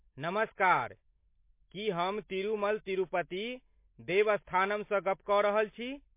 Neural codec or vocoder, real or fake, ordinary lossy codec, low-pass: none; real; MP3, 24 kbps; 3.6 kHz